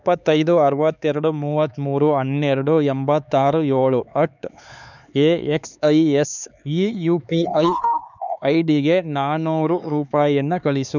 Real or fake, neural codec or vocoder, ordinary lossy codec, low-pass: fake; codec, 16 kHz, 4 kbps, X-Codec, HuBERT features, trained on LibriSpeech; none; 7.2 kHz